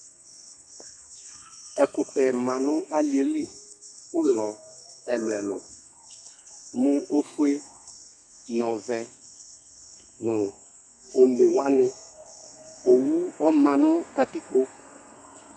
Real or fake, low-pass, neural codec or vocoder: fake; 9.9 kHz; codec, 32 kHz, 1.9 kbps, SNAC